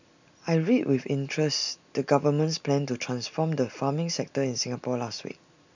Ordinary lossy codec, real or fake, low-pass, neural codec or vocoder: none; real; 7.2 kHz; none